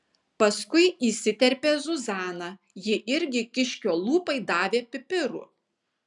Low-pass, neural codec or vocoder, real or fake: 10.8 kHz; none; real